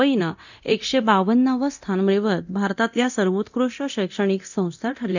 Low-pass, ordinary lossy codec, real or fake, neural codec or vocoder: 7.2 kHz; none; fake; codec, 24 kHz, 0.9 kbps, DualCodec